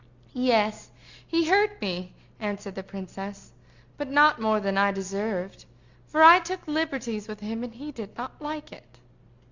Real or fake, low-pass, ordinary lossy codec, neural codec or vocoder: real; 7.2 kHz; Opus, 64 kbps; none